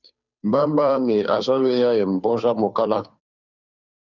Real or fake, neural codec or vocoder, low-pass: fake; codec, 16 kHz, 2 kbps, FunCodec, trained on Chinese and English, 25 frames a second; 7.2 kHz